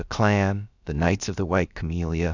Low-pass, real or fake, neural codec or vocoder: 7.2 kHz; fake; codec, 16 kHz, about 1 kbps, DyCAST, with the encoder's durations